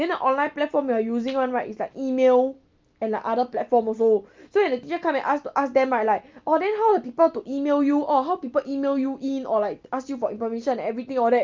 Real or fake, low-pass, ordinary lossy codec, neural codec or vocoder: real; 7.2 kHz; Opus, 24 kbps; none